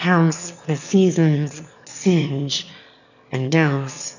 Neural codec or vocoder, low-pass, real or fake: autoencoder, 22.05 kHz, a latent of 192 numbers a frame, VITS, trained on one speaker; 7.2 kHz; fake